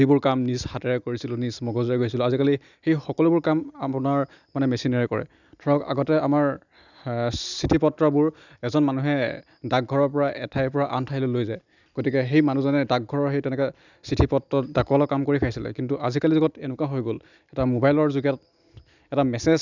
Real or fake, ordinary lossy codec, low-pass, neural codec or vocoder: real; none; 7.2 kHz; none